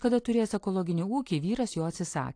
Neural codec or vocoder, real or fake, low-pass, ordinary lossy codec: none; real; 9.9 kHz; AAC, 48 kbps